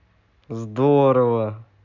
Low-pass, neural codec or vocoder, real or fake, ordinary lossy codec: 7.2 kHz; none; real; none